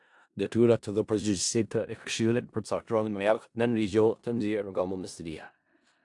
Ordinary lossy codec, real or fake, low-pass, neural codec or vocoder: AAC, 64 kbps; fake; 10.8 kHz; codec, 16 kHz in and 24 kHz out, 0.4 kbps, LongCat-Audio-Codec, four codebook decoder